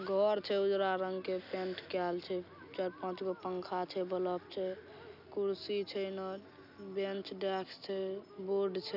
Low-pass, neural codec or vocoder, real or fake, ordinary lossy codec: 5.4 kHz; none; real; none